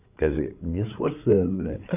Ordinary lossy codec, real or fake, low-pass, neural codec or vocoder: none; fake; 3.6 kHz; codec, 16 kHz, 8 kbps, FreqCodec, larger model